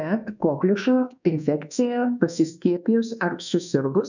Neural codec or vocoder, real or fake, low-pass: codec, 24 kHz, 1.2 kbps, DualCodec; fake; 7.2 kHz